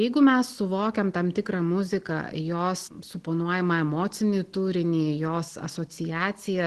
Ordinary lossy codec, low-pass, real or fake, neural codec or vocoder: Opus, 16 kbps; 10.8 kHz; real; none